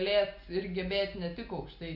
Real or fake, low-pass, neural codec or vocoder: real; 5.4 kHz; none